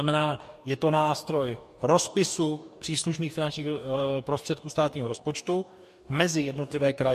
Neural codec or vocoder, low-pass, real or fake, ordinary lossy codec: codec, 44.1 kHz, 2.6 kbps, DAC; 14.4 kHz; fake; MP3, 64 kbps